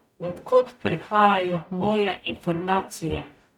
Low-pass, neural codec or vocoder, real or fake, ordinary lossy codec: 19.8 kHz; codec, 44.1 kHz, 0.9 kbps, DAC; fake; none